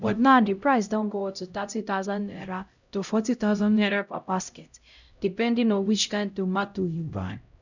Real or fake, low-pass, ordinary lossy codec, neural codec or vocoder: fake; 7.2 kHz; none; codec, 16 kHz, 0.5 kbps, X-Codec, HuBERT features, trained on LibriSpeech